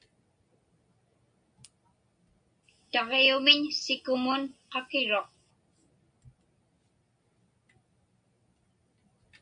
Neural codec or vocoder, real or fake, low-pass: none; real; 9.9 kHz